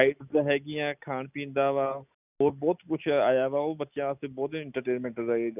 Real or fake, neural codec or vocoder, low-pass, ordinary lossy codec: real; none; 3.6 kHz; none